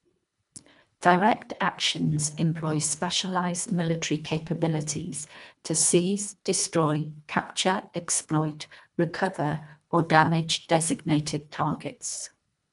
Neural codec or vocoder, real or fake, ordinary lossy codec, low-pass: codec, 24 kHz, 1.5 kbps, HILCodec; fake; none; 10.8 kHz